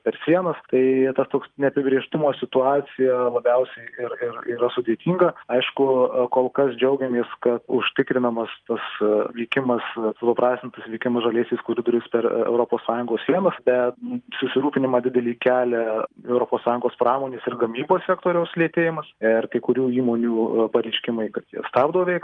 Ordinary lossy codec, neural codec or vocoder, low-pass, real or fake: Opus, 32 kbps; none; 9.9 kHz; real